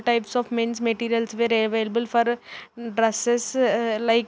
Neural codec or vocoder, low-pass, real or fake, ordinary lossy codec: none; none; real; none